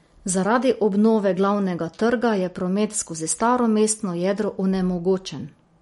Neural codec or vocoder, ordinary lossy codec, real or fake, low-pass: none; MP3, 48 kbps; real; 19.8 kHz